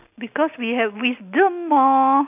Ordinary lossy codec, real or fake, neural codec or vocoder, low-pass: none; real; none; 3.6 kHz